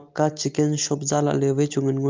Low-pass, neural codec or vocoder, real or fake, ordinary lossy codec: 7.2 kHz; none; real; Opus, 24 kbps